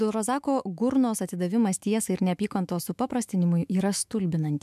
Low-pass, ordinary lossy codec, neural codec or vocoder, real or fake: 14.4 kHz; MP3, 96 kbps; autoencoder, 48 kHz, 128 numbers a frame, DAC-VAE, trained on Japanese speech; fake